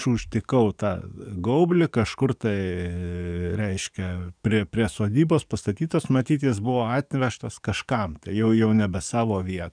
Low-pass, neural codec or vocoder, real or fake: 9.9 kHz; codec, 44.1 kHz, 7.8 kbps, DAC; fake